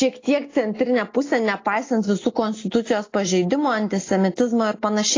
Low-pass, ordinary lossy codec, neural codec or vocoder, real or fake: 7.2 kHz; AAC, 32 kbps; none; real